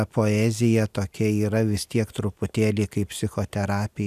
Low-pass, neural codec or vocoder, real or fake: 14.4 kHz; none; real